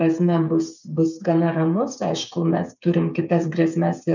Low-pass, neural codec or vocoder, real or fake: 7.2 kHz; codec, 16 kHz, 8 kbps, FreqCodec, smaller model; fake